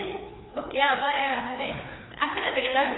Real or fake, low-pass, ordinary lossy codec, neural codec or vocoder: fake; 7.2 kHz; AAC, 16 kbps; codec, 16 kHz, 2 kbps, FreqCodec, larger model